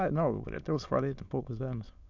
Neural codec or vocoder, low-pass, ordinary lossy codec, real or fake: autoencoder, 22.05 kHz, a latent of 192 numbers a frame, VITS, trained on many speakers; 7.2 kHz; MP3, 64 kbps; fake